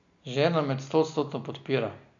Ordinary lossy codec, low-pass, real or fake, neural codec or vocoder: none; 7.2 kHz; real; none